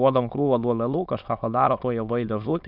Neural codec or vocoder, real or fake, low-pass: autoencoder, 22.05 kHz, a latent of 192 numbers a frame, VITS, trained on many speakers; fake; 5.4 kHz